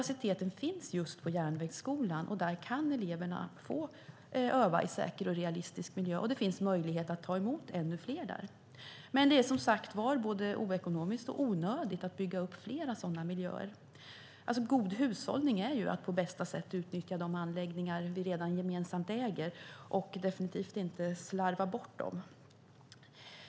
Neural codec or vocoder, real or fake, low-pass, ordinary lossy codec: none; real; none; none